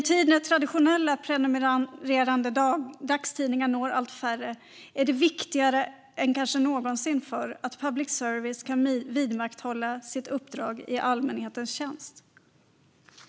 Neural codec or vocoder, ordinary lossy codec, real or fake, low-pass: none; none; real; none